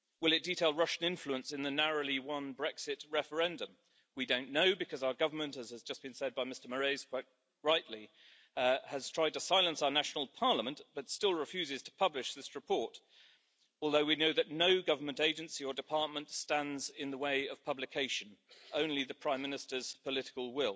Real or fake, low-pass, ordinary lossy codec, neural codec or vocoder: real; none; none; none